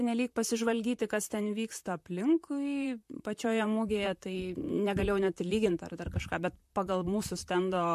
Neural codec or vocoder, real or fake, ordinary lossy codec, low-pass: vocoder, 44.1 kHz, 128 mel bands, Pupu-Vocoder; fake; MP3, 64 kbps; 14.4 kHz